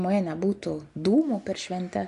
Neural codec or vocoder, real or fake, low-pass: none; real; 10.8 kHz